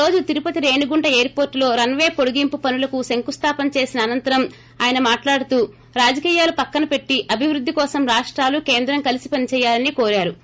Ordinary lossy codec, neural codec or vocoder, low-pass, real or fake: none; none; none; real